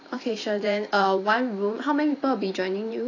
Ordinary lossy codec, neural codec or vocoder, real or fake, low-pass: AAC, 32 kbps; vocoder, 44.1 kHz, 128 mel bands every 512 samples, BigVGAN v2; fake; 7.2 kHz